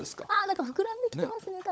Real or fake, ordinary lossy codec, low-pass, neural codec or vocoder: fake; none; none; codec, 16 kHz, 16 kbps, FunCodec, trained on LibriTTS, 50 frames a second